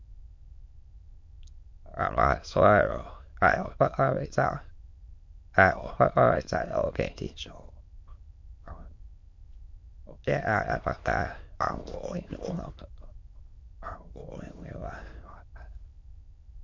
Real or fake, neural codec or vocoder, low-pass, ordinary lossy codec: fake; autoencoder, 22.05 kHz, a latent of 192 numbers a frame, VITS, trained on many speakers; 7.2 kHz; MP3, 48 kbps